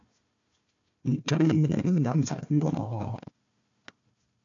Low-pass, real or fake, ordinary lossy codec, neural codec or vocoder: 7.2 kHz; fake; AAC, 48 kbps; codec, 16 kHz, 1 kbps, FunCodec, trained on Chinese and English, 50 frames a second